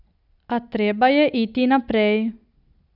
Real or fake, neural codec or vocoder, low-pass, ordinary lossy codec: real; none; 5.4 kHz; none